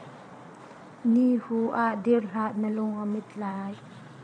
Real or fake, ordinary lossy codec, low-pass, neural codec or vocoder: fake; MP3, 96 kbps; 9.9 kHz; vocoder, 22.05 kHz, 80 mel bands, Vocos